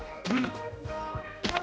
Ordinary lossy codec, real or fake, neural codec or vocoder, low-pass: none; fake; codec, 16 kHz, 1 kbps, X-Codec, HuBERT features, trained on general audio; none